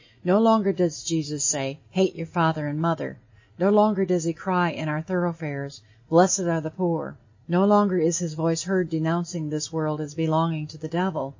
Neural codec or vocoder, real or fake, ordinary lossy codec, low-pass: none; real; MP3, 32 kbps; 7.2 kHz